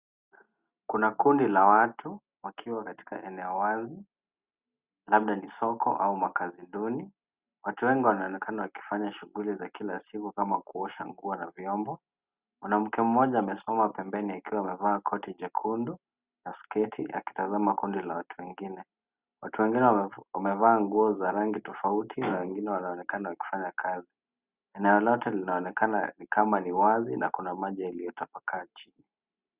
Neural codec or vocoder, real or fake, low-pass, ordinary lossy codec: none; real; 3.6 kHz; Opus, 64 kbps